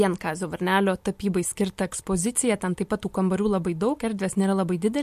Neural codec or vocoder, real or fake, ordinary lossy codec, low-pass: none; real; MP3, 64 kbps; 14.4 kHz